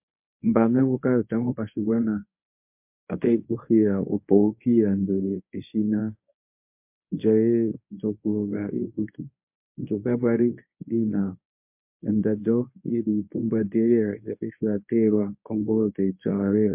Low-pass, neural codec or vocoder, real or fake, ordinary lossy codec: 3.6 kHz; codec, 24 kHz, 0.9 kbps, WavTokenizer, medium speech release version 1; fake; MP3, 32 kbps